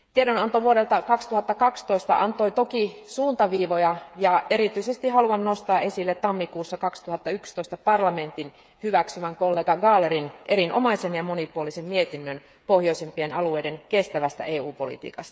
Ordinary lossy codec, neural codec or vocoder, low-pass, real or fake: none; codec, 16 kHz, 8 kbps, FreqCodec, smaller model; none; fake